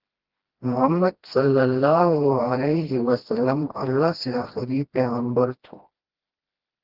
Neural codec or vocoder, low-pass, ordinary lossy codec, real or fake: codec, 16 kHz, 1 kbps, FreqCodec, smaller model; 5.4 kHz; Opus, 16 kbps; fake